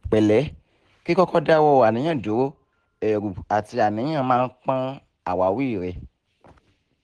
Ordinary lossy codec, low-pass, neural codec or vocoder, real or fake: Opus, 16 kbps; 10.8 kHz; none; real